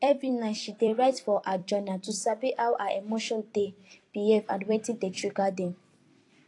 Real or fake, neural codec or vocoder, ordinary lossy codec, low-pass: fake; vocoder, 44.1 kHz, 128 mel bands every 256 samples, BigVGAN v2; AAC, 48 kbps; 10.8 kHz